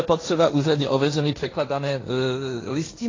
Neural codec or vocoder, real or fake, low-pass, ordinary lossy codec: codec, 16 kHz, 1.1 kbps, Voila-Tokenizer; fake; 7.2 kHz; AAC, 32 kbps